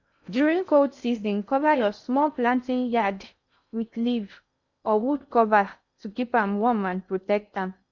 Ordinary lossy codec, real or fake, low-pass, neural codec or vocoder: none; fake; 7.2 kHz; codec, 16 kHz in and 24 kHz out, 0.6 kbps, FocalCodec, streaming, 2048 codes